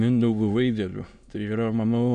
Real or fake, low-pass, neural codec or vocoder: fake; 9.9 kHz; autoencoder, 22.05 kHz, a latent of 192 numbers a frame, VITS, trained on many speakers